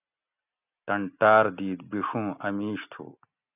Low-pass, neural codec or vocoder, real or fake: 3.6 kHz; none; real